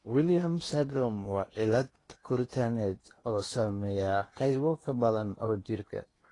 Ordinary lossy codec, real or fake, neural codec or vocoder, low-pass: AAC, 32 kbps; fake; codec, 16 kHz in and 24 kHz out, 0.8 kbps, FocalCodec, streaming, 65536 codes; 10.8 kHz